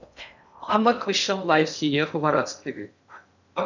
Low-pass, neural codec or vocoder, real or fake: 7.2 kHz; codec, 16 kHz in and 24 kHz out, 0.6 kbps, FocalCodec, streaming, 2048 codes; fake